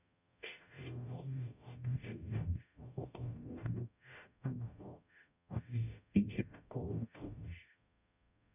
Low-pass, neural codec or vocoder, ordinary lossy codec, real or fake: 3.6 kHz; codec, 44.1 kHz, 0.9 kbps, DAC; none; fake